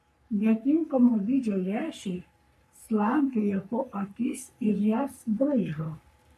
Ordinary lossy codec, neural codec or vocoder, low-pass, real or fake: AAC, 96 kbps; codec, 44.1 kHz, 3.4 kbps, Pupu-Codec; 14.4 kHz; fake